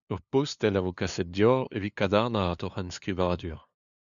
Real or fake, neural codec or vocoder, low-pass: fake; codec, 16 kHz, 2 kbps, FunCodec, trained on LibriTTS, 25 frames a second; 7.2 kHz